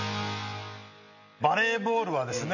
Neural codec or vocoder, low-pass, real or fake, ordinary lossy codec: none; 7.2 kHz; real; none